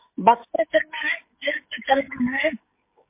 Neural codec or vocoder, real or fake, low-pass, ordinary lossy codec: codec, 16 kHz, 16 kbps, FreqCodec, smaller model; fake; 3.6 kHz; MP3, 24 kbps